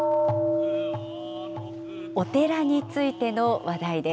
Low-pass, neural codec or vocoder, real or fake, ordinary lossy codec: none; none; real; none